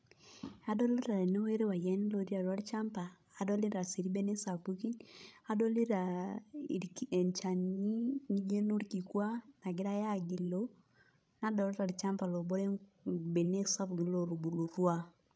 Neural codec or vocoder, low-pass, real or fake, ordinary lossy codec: codec, 16 kHz, 16 kbps, FreqCodec, larger model; none; fake; none